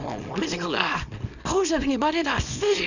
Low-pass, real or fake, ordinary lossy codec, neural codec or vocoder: 7.2 kHz; fake; none; codec, 24 kHz, 0.9 kbps, WavTokenizer, small release